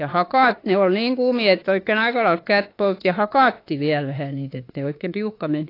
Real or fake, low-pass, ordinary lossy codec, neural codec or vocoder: fake; 5.4 kHz; AAC, 32 kbps; autoencoder, 48 kHz, 32 numbers a frame, DAC-VAE, trained on Japanese speech